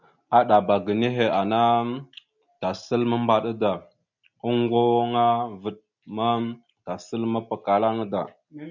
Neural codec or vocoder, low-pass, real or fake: none; 7.2 kHz; real